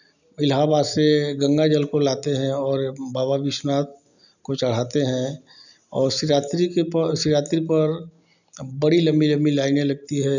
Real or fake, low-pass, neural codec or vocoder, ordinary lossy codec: real; 7.2 kHz; none; none